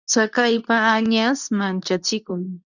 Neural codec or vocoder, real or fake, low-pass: codec, 24 kHz, 0.9 kbps, WavTokenizer, medium speech release version 2; fake; 7.2 kHz